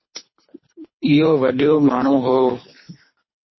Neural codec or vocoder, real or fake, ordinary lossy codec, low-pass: codec, 24 kHz, 3 kbps, HILCodec; fake; MP3, 24 kbps; 7.2 kHz